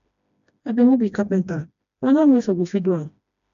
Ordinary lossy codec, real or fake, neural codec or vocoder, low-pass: AAC, 96 kbps; fake; codec, 16 kHz, 1 kbps, FreqCodec, smaller model; 7.2 kHz